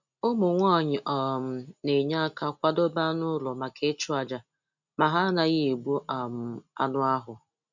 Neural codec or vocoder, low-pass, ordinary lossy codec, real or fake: none; 7.2 kHz; none; real